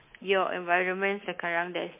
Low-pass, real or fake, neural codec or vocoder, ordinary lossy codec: 3.6 kHz; fake; codec, 24 kHz, 3.1 kbps, DualCodec; MP3, 32 kbps